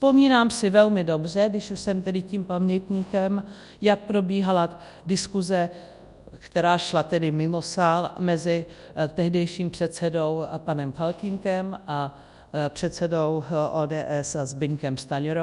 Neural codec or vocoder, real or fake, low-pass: codec, 24 kHz, 0.9 kbps, WavTokenizer, large speech release; fake; 10.8 kHz